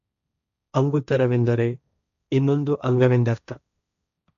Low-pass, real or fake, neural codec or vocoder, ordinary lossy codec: 7.2 kHz; fake; codec, 16 kHz, 1.1 kbps, Voila-Tokenizer; none